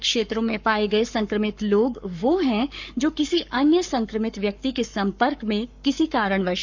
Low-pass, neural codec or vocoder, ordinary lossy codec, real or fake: 7.2 kHz; codec, 44.1 kHz, 7.8 kbps, Pupu-Codec; none; fake